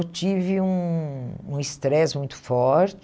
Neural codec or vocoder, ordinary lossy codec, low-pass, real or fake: none; none; none; real